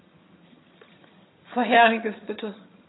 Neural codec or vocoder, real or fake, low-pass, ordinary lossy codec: vocoder, 22.05 kHz, 80 mel bands, HiFi-GAN; fake; 7.2 kHz; AAC, 16 kbps